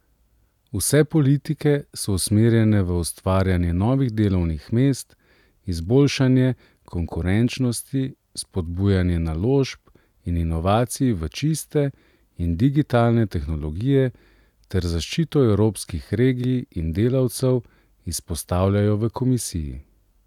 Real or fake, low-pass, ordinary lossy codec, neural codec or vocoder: real; 19.8 kHz; none; none